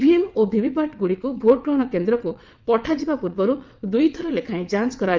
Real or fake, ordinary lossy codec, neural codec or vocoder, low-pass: fake; Opus, 24 kbps; vocoder, 22.05 kHz, 80 mel bands, WaveNeXt; 7.2 kHz